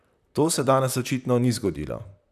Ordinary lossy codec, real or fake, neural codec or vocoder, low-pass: none; fake; vocoder, 44.1 kHz, 128 mel bands, Pupu-Vocoder; 14.4 kHz